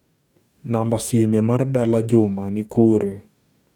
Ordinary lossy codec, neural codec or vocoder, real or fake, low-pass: none; codec, 44.1 kHz, 2.6 kbps, DAC; fake; 19.8 kHz